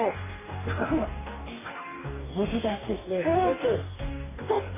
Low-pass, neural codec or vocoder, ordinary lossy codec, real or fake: 3.6 kHz; codec, 44.1 kHz, 2.6 kbps, DAC; MP3, 16 kbps; fake